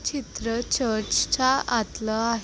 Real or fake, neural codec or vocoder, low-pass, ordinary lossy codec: real; none; none; none